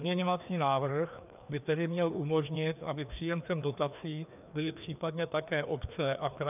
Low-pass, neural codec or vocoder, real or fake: 3.6 kHz; codec, 16 kHz, 2 kbps, FreqCodec, larger model; fake